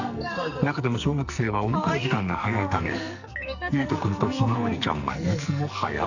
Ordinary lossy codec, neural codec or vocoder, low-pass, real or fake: none; codec, 44.1 kHz, 2.6 kbps, SNAC; 7.2 kHz; fake